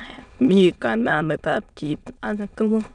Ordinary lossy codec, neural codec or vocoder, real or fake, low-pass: none; autoencoder, 22.05 kHz, a latent of 192 numbers a frame, VITS, trained on many speakers; fake; 9.9 kHz